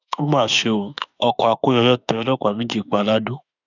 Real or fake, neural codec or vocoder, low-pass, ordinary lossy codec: fake; autoencoder, 48 kHz, 32 numbers a frame, DAC-VAE, trained on Japanese speech; 7.2 kHz; none